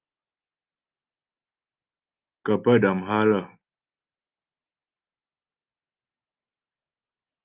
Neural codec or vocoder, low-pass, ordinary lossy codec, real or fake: none; 3.6 kHz; Opus, 32 kbps; real